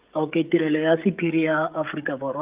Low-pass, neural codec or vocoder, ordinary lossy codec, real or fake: 3.6 kHz; codec, 16 kHz, 16 kbps, FreqCodec, smaller model; Opus, 24 kbps; fake